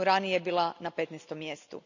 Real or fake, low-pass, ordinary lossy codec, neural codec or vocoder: real; 7.2 kHz; none; none